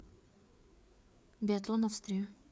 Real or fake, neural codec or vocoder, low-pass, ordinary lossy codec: fake; codec, 16 kHz, 4 kbps, FreqCodec, larger model; none; none